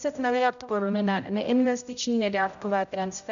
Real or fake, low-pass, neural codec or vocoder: fake; 7.2 kHz; codec, 16 kHz, 0.5 kbps, X-Codec, HuBERT features, trained on general audio